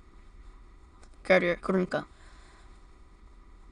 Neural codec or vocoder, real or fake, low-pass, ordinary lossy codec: autoencoder, 22.05 kHz, a latent of 192 numbers a frame, VITS, trained on many speakers; fake; 9.9 kHz; Opus, 64 kbps